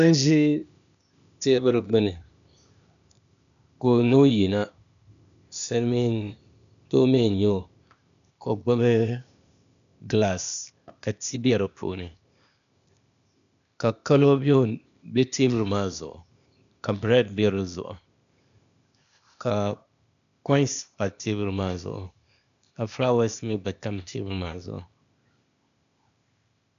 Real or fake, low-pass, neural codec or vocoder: fake; 7.2 kHz; codec, 16 kHz, 0.8 kbps, ZipCodec